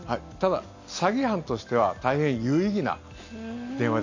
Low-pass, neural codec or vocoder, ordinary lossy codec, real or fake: 7.2 kHz; none; AAC, 48 kbps; real